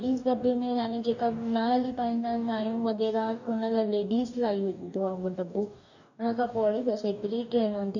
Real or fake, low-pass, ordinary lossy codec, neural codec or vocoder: fake; 7.2 kHz; none; codec, 44.1 kHz, 2.6 kbps, DAC